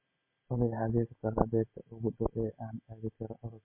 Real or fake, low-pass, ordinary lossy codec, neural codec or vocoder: real; 3.6 kHz; MP3, 24 kbps; none